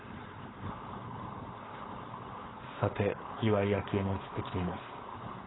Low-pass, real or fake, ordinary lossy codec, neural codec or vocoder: 7.2 kHz; fake; AAC, 16 kbps; codec, 16 kHz, 4.8 kbps, FACodec